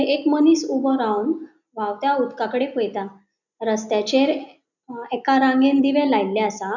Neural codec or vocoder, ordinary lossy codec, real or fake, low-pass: none; none; real; 7.2 kHz